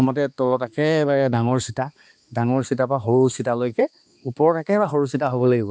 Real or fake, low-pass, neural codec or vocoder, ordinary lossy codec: fake; none; codec, 16 kHz, 2 kbps, X-Codec, HuBERT features, trained on balanced general audio; none